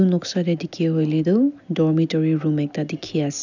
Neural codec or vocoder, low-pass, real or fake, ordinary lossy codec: none; 7.2 kHz; real; none